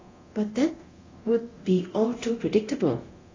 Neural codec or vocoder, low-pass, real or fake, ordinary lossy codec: codec, 24 kHz, 0.9 kbps, DualCodec; 7.2 kHz; fake; AAC, 32 kbps